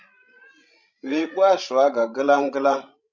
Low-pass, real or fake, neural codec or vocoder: 7.2 kHz; fake; codec, 16 kHz, 16 kbps, FreqCodec, larger model